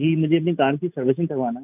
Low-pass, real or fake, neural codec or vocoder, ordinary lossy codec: 3.6 kHz; real; none; none